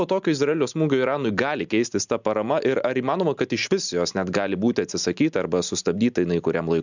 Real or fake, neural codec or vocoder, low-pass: real; none; 7.2 kHz